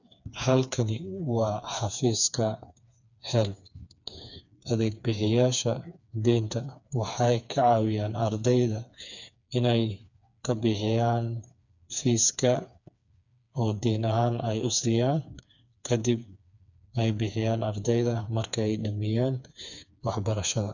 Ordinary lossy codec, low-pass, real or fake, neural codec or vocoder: none; 7.2 kHz; fake; codec, 16 kHz, 4 kbps, FreqCodec, smaller model